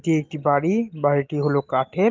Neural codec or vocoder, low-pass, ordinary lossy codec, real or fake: none; 7.2 kHz; Opus, 24 kbps; real